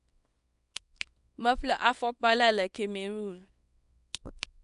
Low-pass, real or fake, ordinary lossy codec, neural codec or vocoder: 10.8 kHz; fake; none; codec, 24 kHz, 0.9 kbps, WavTokenizer, small release